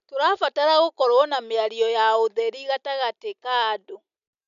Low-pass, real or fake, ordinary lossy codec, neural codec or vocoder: 7.2 kHz; real; none; none